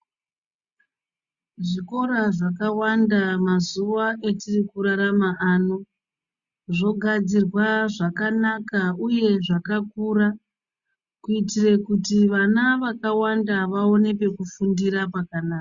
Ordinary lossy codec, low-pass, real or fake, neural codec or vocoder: Opus, 64 kbps; 7.2 kHz; real; none